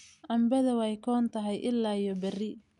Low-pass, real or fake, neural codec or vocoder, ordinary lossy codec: 10.8 kHz; real; none; none